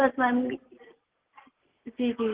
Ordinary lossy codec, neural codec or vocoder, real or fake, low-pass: Opus, 16 kbps; none; real; 3.6 kHz